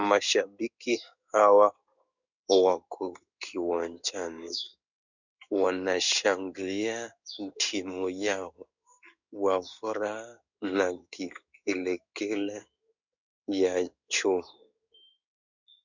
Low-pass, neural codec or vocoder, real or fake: 7.2 kHz; codec, 16 kHz in and 24 kHz out, 1 kbps, XY-Tokenizer; fake